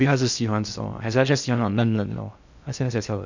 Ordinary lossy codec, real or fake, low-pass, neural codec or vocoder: none; fake; 7.2 kHz; codec, 16 kHz in and 24 kHz out, 0.6 kbps, FocalCodec, streaming, 2048 codes